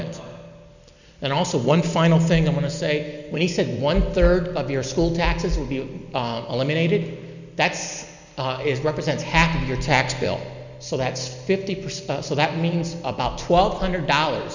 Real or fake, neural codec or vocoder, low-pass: real; none; 7.2 kHz